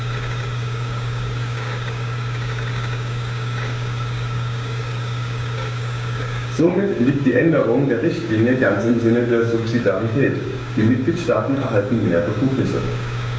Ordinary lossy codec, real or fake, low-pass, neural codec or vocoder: none; fake; none; codec, 16 kHz, 6 kbps, DAC